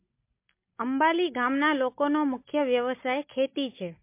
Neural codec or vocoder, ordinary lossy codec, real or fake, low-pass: none; MP3, 24 kbps; real; 3.6 kHz